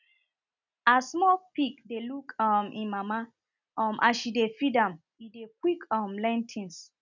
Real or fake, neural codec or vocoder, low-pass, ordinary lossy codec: real; none; 7.2 kHz; none